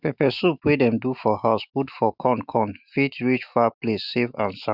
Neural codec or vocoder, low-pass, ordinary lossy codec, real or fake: autoencoder, 48 kHz, 128 numbers a frame, DAC-VAE, trained on Japanese speech; 5.4 kHz; Opus, 64 kbps; fake